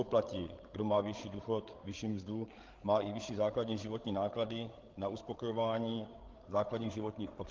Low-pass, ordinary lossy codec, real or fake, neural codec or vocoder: 7.2 kHz; Opus, 16 kbps; fake; codec, 16 kHz, 8 kbps, FreqCodec, larger model